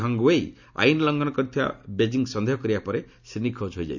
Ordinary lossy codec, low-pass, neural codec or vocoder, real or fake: none; 7.2 kHz; none; real